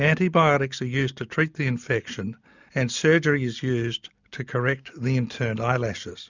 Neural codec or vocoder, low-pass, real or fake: none; 7.2 kHz; real